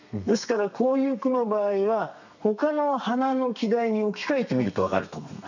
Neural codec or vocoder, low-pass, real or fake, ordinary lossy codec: codec, 32 kHz, 1.9 kbps, SNAC; 7.2 kHz; fake; none